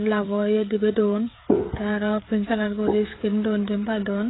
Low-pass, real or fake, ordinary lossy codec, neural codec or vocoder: 7.2 kHz; fake; AAC, 16 kbps; codec, 16 kHz, 16 kbps, FreqCodec, smaller model